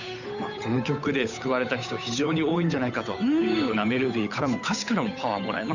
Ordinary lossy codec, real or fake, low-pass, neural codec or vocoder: none; fake; 7.2 kHz; codec, 16 kHz, 8 kbps, FunCodec, trained on Chinese and English, 25 frames a second